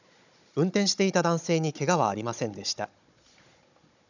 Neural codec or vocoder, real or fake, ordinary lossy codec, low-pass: codec, 16 kHz, 16 kbps, FunCodec, trained on Chinese and English, 50 frames a second; fake; none; 7.2 kHz